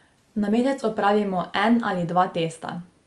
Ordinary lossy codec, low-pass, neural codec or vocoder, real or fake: Opus, 32 kbps; 10.8 kHz; none; real